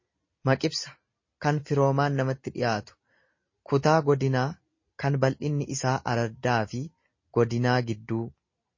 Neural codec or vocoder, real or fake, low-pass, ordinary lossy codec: none; real; 7.2 kHz; MP3, 32 kbps